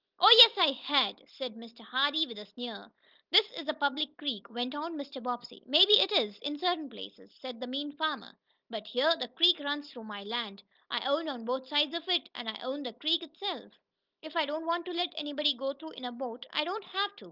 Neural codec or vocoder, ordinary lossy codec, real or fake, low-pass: none; Opus, 24 kbps; real; 5.4 kHz